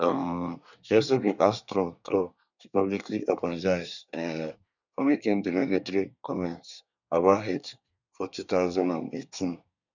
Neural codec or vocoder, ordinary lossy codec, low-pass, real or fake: codec, 24 kHz, 1 kbps, SNAC; none; 7.2 kHz; fake